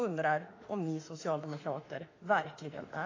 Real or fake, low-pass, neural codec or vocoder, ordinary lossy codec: fake; 7.2 kHz; autoencoder, 48 kHz, 32 numbers a frame, DAC-VAE, trained on Japanese speech; AAC, 32 kbps